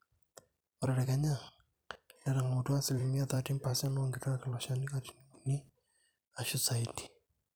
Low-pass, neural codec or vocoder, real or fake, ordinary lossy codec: none; vocoder, 44.1 kHz, 128 mel bands every 256 samples, BigVGAN v2; fake; none